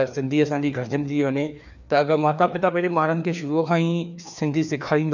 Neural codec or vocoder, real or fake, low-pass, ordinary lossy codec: codec, 16 kHz, 2 kbps, FreqCodec, larger model; fake; 7.2 kHz; none